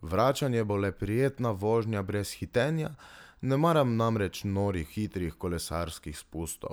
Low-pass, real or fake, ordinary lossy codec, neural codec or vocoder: none; real; none; none